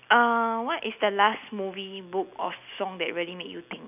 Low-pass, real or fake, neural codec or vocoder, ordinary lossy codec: 3.6 kHz; real; none; none